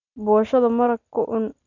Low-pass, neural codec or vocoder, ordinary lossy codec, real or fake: 7.2 kHz; none; none; real